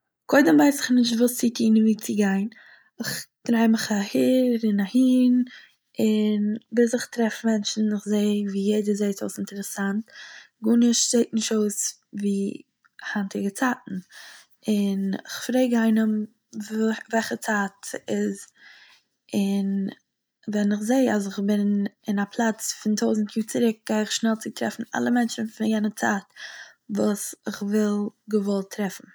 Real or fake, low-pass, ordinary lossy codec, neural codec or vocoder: real; none; none; none